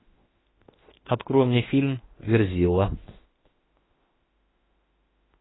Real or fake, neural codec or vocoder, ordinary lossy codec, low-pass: fake; autoencoder, 48 kHz, 32 numbers a frame, DAC-VAE, trained on Japanese speech; AAC, 16 kbps; 7.2 kHz